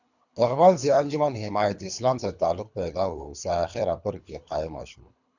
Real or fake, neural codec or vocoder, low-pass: fake; codec, 24 kHz, 3 kbps, HILCodec; 7.2 kHz